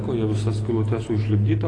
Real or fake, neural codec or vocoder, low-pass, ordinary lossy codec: real; none; 9.9 kHz; AAC, 32 kbps